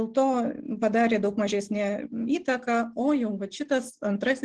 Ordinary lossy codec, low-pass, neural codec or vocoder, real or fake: Opus, 16 kbps; 9.9 kHz; none; real